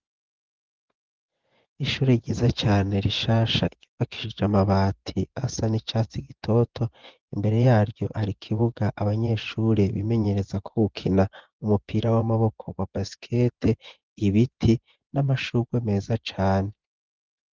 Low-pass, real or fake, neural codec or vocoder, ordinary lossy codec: 7.2 kHz; fake; vocoder, 24 kHz, 100 mel bands, Vocos; Opus, 16 kbps